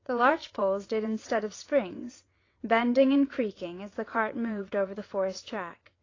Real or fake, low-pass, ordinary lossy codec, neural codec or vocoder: fake; 7.2 kHz; AAC, 32 kbps; vocoder, 22.05 kHz, 80 mel bands, WaveNeXt